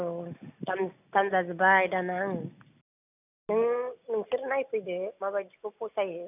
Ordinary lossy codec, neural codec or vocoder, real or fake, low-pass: none; none; real; 3.6 kHz